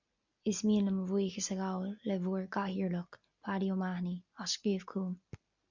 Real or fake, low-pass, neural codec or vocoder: real; 7.2 kHz; none